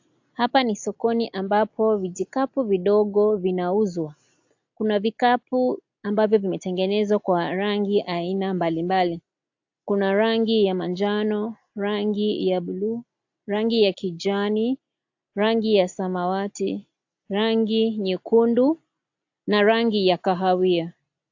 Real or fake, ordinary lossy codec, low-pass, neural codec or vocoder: real; AAC, 48 kbps; 7.2 kHz; none